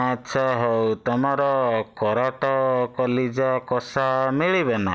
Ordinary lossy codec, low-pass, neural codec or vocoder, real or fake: none; none; none; real